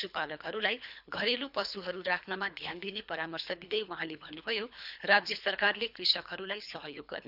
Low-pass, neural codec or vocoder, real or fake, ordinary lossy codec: 5.4 kHz; codec, 24 kHz, 3 kbps, HILCodec; fake; none